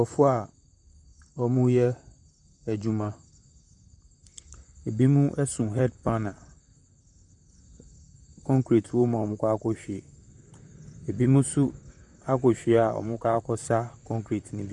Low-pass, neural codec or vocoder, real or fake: 10.8 kHz; vocoder, 44.1 kHz, 128 mel bands, Pupu-Vocoder; fake